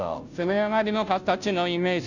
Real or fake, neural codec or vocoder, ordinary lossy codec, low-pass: fake; codec, 16 kHz, 0.5 kbps, FunCodec, trained on Chinese and English, 25 frames a second; none; 7.2 kHz